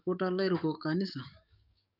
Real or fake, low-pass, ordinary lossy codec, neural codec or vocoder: fake; 5.4 kHz; none; codec, 44.1 kHz, 7.8 kbps, DAC